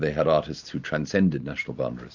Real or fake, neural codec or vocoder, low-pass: real; none; 7.2 kHz